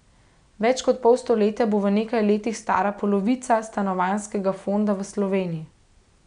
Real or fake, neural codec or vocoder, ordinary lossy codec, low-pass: real; none; none; 9.9 kHz